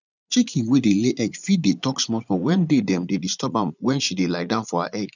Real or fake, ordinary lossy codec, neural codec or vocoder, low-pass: fake; none; vocoder, 44.1 kHz, 80 mel bands, Vocos; 7.2 kHz